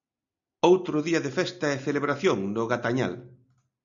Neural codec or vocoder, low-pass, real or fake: none; 7.2 kHz; real